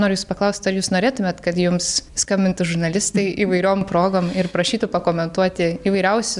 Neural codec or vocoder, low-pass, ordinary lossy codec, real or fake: none; 10.8 kHz; MP3, 96 kbps; real